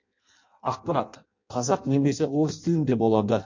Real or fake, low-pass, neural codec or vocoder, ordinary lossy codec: fake; 7.2 kHz; codec, 16 kHz in and 24 kHz out, 0.6 kbps, FireRedTTS-2 codec; MP3, 48 kbps